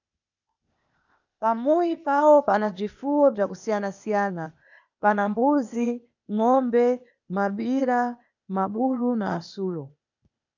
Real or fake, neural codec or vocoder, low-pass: fake; codec, 16 kHz, 0.8 kbps, ZipCodec; 7.2 kHz